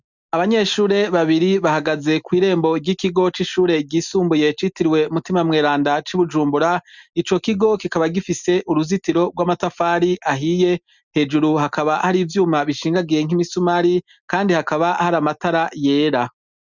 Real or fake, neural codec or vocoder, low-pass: real; none; 7.2 kHz